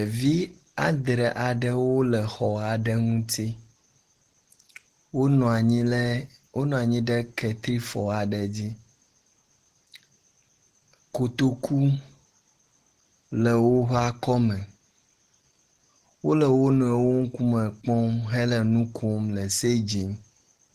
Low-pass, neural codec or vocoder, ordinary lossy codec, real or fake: 14.4 kHz; none; Opus, 16 kbps; real